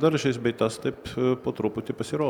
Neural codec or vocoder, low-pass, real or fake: none; 19.8 kHz; real